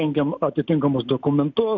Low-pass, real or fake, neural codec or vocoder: 7.2 kHz; real; none